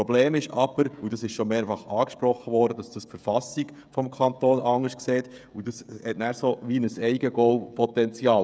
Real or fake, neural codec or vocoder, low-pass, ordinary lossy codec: fake; codec, 16 kHz, 16 kbps, FreqCodec, smaller model; none; none